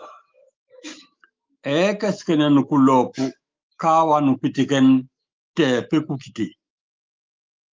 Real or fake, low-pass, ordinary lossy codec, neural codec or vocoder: fake; 7.2 kHz; Opus, 24 kbps; autoencoder, 48 kHz, 128 numbers a frame, DAC-VAE, trained on Japanese speech